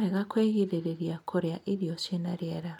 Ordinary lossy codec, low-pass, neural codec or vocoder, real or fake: none; 19.8 kHz; none; real